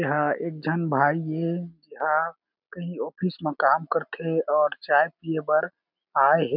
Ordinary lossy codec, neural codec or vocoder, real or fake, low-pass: none; none; real; 5.4 kHz